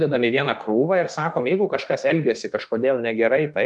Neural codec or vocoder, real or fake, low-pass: autoencoder, 48 kHz, 32 numbers a frame, DAC-VAE, trained on Japanese speech; fake; 10.8 kHz